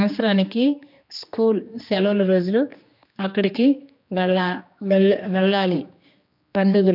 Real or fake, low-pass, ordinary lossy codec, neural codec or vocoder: fake; 5.4 kHz; MP3, 48 kbps; codec, 16 kHz, 2 kbps, X-Codec, HuBERT features, trained on general audio